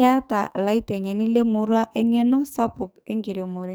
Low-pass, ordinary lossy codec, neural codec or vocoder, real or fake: none; none; codec, 44.1 kHz, 2.6 kbps, SNAC; fake